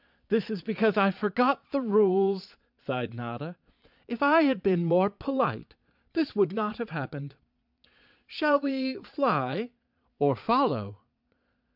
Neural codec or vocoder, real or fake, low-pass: vocoder, 22.05 kHz, 80 mel bands, Vocos; fake; 5.4 kHz